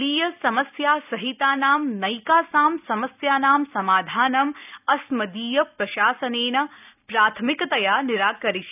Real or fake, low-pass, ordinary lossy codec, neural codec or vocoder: real; 3.6 kHz; none; none